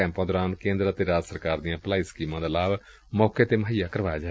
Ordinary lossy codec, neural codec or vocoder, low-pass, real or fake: none; none; none; real